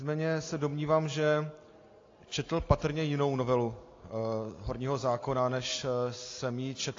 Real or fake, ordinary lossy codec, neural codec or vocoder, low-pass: real; AAC, 32 kbps; none; 7.2 kHz